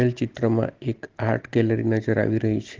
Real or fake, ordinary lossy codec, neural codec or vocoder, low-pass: real; Opus, 16 kbps; none; 7.2 kHz